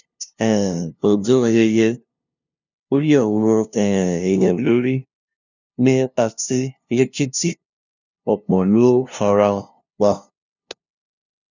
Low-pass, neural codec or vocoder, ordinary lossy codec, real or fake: 7.2 kHz; codec, 16 kHz, 0.5 kbps, FunCodec, trained on LibriTTS, 25 frames a second; none; fake